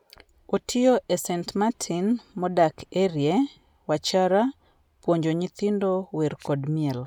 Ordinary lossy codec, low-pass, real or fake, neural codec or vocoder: none; 19.8 kHz; real; none